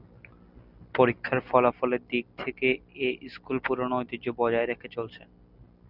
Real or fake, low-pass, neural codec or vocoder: real; 5.4 kHz; none